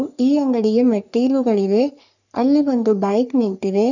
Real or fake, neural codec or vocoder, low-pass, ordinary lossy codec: fake; codec, 44.1 kHz, 3.4 kbps, Pupu-Codec; 7.2 kHz; none